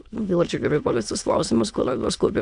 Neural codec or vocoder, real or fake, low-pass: autoencoder, 22.05 kHz, a latent of 192 numbers a frame, VITS, trained on many speakers; fake; 9.9 kHz